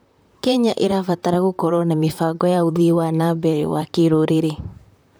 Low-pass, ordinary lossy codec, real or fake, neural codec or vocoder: none; none; fake; vocoder, 44.1 kHz, 128 mel bands, Pupu-Vocoder